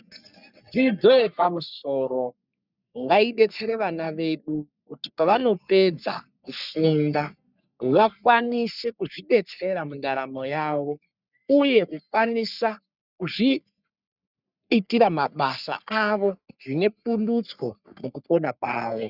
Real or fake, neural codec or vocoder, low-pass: fake; codec, 44.1 kHz, 3.4 kbps, Pupu-Codec; 5.4 kHz